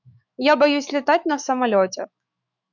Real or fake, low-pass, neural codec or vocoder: fake; 7.2 kHz; autoencoder, 48 kHz, 128 numbers a frame, DAC-VAE, trained on Japanese speech